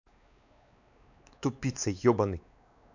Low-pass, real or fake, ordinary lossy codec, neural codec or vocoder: 7.2 kHz; fake; none; codec, 16 kHz, 4 kbps, X-Codec, WavLM features, trained on Multilingual LibriSpeech